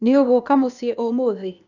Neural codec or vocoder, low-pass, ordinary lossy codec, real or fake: codec, 16 kHz, 0.8 kbps, ZipCodec; 7.2 kHz; none; fake